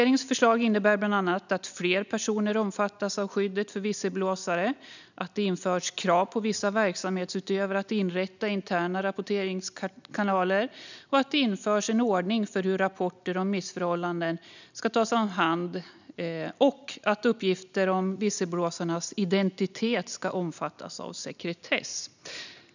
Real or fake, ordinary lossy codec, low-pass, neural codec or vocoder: real; none; 7.2 kHz; none